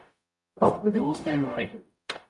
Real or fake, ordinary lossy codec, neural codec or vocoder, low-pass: fake; MP3, 64 kbps; codec, 44.1 kHz, 0.9 kbps, DAC; 10.8 kHz